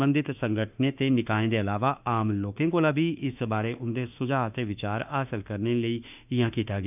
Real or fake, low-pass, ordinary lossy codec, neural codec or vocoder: fake; 3.6 kHz; none; autoencoder, 48 kHz, 32 numbers a frame, DAC-VAE, trained on Japanese speech